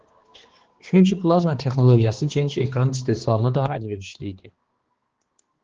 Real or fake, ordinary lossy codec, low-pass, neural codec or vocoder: fake; Opus, 16 kbps; 7.2 kHz; codec, 16 kHz, 2 kbps, X-Codec, HuBERT features, trained on balanced general audio